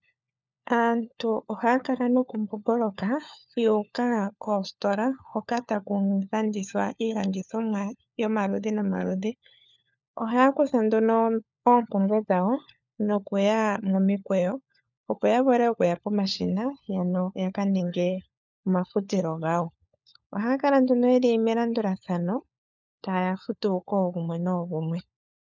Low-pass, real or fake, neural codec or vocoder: 7.2 kHz; fake; codec, 16 kHz, 4 kbps, FunCodec, trained on LibriTTS, 50 frames a second